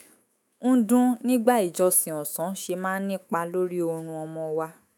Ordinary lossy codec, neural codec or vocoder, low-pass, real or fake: none; autoencoder, 48 kHz, 128 numbers a frame, DAC-VAE, trained on Japanese speech; none; fake